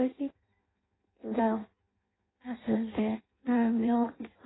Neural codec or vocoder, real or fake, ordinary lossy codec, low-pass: codec, 16 kHz in and 24 kHz out, 0.6 kbps, FireRedTTS-2 codec; fake; AAC, 16 kbps; 7.2 kHz